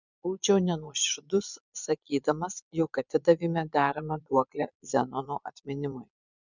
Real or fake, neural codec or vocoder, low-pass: fake; vocoder, 22.05 kHz, 80 mel bands, Vocos; 7.2 kHz